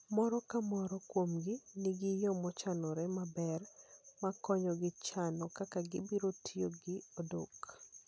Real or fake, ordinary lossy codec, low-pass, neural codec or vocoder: real; none; none; none